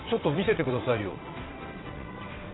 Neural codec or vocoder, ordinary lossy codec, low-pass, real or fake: vocoder, 22.05 kHz, 80 mel bands, WaveNeXt; AAC, 16 kbps; 7.2 kHz; fake